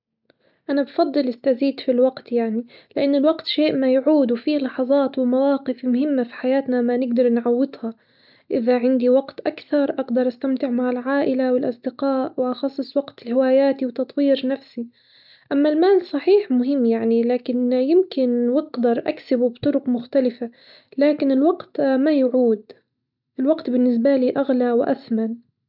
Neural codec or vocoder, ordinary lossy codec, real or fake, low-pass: none; none; real; 5.4 kHz